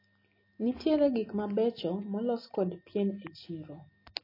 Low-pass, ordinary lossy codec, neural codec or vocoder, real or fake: 5.4 kHz; MP3, 24 kbps; none; real